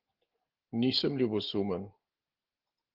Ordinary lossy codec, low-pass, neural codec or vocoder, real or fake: Opus, 16 kbps; 5.4 kHz; none; real